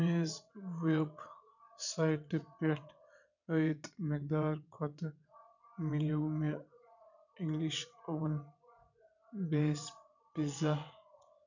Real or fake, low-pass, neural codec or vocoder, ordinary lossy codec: fake; 7.2 kHz; vocoder, 22.05 kHz, 80 mel bands, WaveNeXt; none